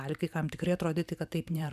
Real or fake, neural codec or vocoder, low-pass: fake; vocoder, 44.1 kHz, 128 mel bands every 512 samples, BigVGAN v2; 14.4 kHz